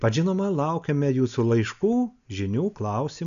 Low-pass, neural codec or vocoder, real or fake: 7.2 kHz; none; real